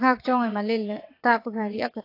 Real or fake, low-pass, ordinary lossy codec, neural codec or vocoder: fake; 5.4 kHz; AAC, 32 kbps; vocoder, 22.05 kHz, 80 mel bands, WaveNeXt